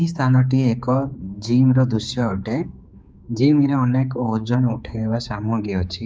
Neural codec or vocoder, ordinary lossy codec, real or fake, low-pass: codec, 16 kHz, 4 kbps, X-Codec, HuBERT features, trained on general audio; none; fake; none